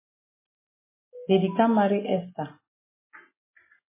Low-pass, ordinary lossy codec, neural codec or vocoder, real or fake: 3.6 kHz; MP3, 16 kbps; none; real